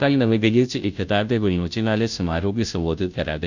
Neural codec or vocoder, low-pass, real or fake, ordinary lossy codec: codec, 16 kHz, 0.5 kbps, FunCodec, trained on Chinese and English, 25 frames a second; 7.2 kHz; fake; none